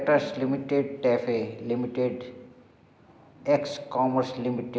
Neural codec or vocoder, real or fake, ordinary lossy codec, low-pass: none; real; none; none